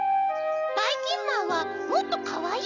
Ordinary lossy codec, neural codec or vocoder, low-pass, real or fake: none; none; 7.2 kHz; real